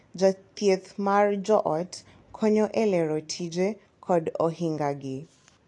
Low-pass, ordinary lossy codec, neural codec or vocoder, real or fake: 10.8 kHz; AAC, 48 kbps; none; real